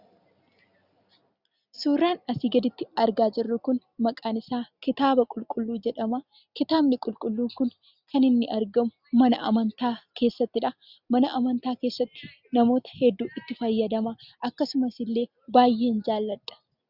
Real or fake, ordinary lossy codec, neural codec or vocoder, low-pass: real; Opus, 64 kbps; none; 5.4 kHz